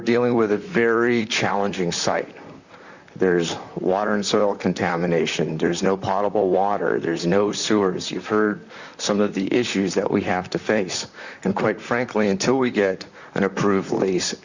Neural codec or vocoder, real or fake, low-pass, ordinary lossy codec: vocoder, 44.1 kHz, 128 mel bands, Pupu-Vocoder; fake; 7.2 kHz; Opus, 64 kbps